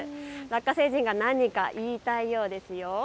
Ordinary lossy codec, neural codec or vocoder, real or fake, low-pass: none; none; real; none